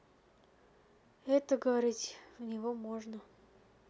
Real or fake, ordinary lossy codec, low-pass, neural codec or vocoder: real; none; none; none